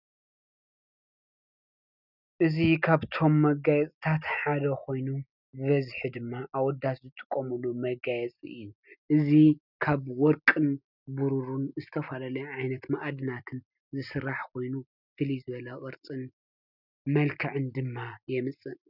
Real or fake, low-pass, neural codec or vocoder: real; 5.4 kHz; none